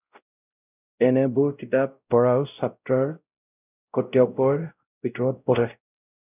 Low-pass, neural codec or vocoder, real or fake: 3.6 kHz; codec, 16 kHz, 0.5 kbps, X-Codec, WavLM features, trained on Multilingual LibriSpeech; fake